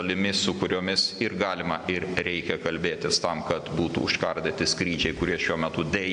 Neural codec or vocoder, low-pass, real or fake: none; 9.9 kHz; real